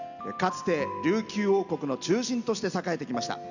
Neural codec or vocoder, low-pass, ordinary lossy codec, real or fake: none; 7.2 kHz; none; real